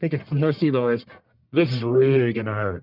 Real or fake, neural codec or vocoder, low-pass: fake; codec, 44.1 kHz, 1.7 kbps, Pupu-Codec; 5.4 kHz